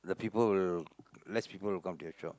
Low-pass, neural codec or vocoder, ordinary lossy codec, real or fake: none; none; none; real